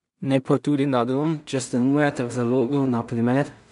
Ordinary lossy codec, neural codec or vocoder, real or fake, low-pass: none; codec, 16 kHz in and 24 kHz out, 0.4 kbps, LongCat-Audio-Codec, two codebook decoder; fake; 10.8 kHz